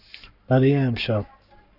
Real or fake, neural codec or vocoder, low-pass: fake; codec, 44.1 kHz, 7.8 kbps, Pupu-Codec; 5.4 kHz